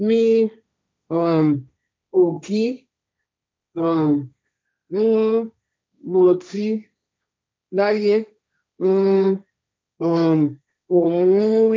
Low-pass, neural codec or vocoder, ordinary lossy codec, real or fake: none; codec, 16 kHz, 1.1 kbps, Voila-Tokenizer; none; fake